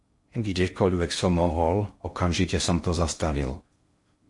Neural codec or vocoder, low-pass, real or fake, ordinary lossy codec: codec, 16 kHz in and 24 kHz out, 0.6 kbps, FocalCodec, streaming, 2048 codes; 10.8 kHz; fake; MP3, 48 kbps